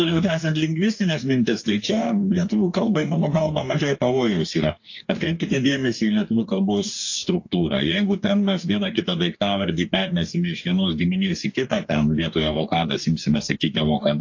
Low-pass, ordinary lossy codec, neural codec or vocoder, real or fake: 7.2 kHz; AAC, 48 kbps; codec, 44.1 kHz, 2.6 kbps, DAC; fake